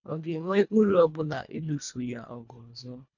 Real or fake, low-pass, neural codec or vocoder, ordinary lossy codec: fake; 7.2 kHz; codec, 24 kHz, 1.5 kbps, HILCodec; none